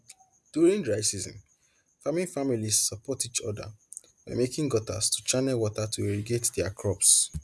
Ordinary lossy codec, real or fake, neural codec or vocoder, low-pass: none; real; none; none